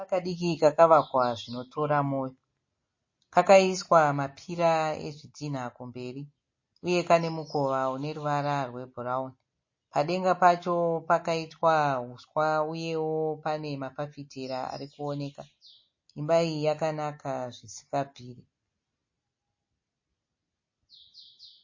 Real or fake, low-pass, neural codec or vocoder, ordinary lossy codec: real; 7.2 kHz; none; MP3, 32 kbps